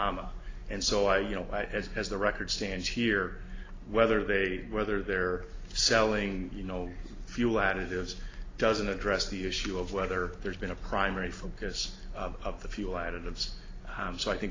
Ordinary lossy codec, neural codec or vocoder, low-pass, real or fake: AAC, 32 kbps; none; 7.2 kHz; real